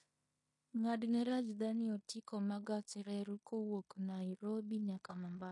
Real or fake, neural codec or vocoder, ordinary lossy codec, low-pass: fake; codec, 16 kHz in and 24 kHz out, 0.9 kbps, LongCat-Audio-Codec, fine tuned four codebook decoder; MP3, 48 kbps; 10.8 kHz